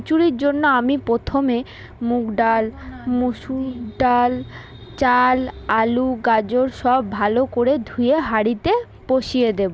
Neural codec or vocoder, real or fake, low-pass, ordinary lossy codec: none; real; none; none